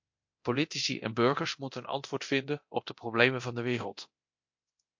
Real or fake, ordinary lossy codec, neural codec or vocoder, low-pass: fake; MP3, 48 kbps; codec, 24 kHz, 0.9 kbps, DualCodec; 7.2 kHz